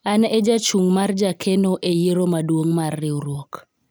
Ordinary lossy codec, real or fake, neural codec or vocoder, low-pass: none; real; none; none